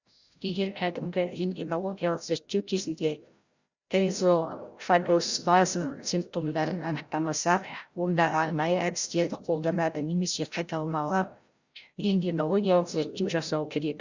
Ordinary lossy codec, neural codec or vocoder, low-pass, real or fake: Opus, 64 kbps; codec, 16 kHz, 0.5 kbps, FreqCodec, larger model; 7.2 kHz; fake